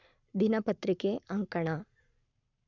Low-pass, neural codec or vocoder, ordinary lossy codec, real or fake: 7.2 kHz; codec, 44.1 kHz, 7.8 kbps, Pupu-Codec; none; fake